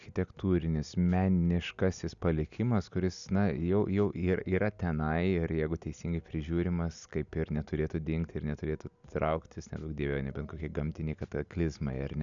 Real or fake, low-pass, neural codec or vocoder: real; 7.2 kHz; none